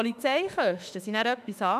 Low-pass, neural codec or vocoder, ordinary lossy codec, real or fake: 14.4 kHz; autoencoder, 48 kHz, 32 numbers a frame, DAC-VAE, trained on Japanese speech; none; fake